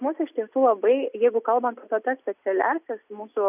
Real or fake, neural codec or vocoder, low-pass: real; none; 3.6 kHz